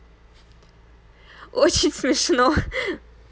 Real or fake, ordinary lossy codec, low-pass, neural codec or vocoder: real; none; none; none